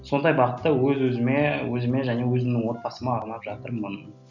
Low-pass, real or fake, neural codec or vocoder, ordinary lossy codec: 7.2 kHz; real; none; none